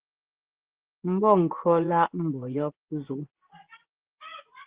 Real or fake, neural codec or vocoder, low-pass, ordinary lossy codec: fake; vocoder, 24 kHz, 100 mel bands, Vocos; 3.6 kHz; Opus, 16 kbps